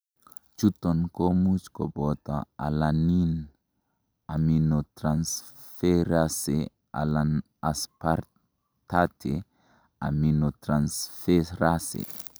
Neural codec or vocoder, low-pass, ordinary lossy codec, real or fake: none; none; none; real